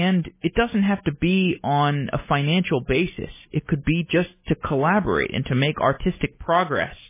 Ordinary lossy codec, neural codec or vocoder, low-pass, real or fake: MP3, 16 kbps; none; 3.6 kHz; real